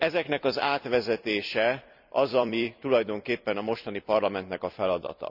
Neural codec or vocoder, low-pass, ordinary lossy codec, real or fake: vocoder, 44.1 kHz, 128 mel bands every 256 samples, BigVGAN v2; 5.4 kHz; none; fake